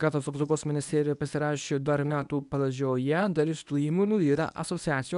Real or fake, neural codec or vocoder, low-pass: fake; codec, 24 kHz, 0.9 kbps, WavTokenizer, medium speech release version 1; 10.8 kHz